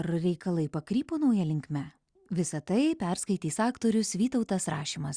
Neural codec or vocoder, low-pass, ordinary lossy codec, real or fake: none; 9.9 kHz; Opus, 64 kbps; real